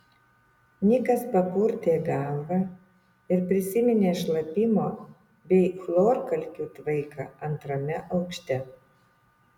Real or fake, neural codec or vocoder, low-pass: real; none; 19.8 kHz